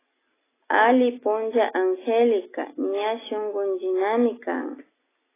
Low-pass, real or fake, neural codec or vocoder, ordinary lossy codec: 3.6 kHz; real; none; AAC, 16 kbps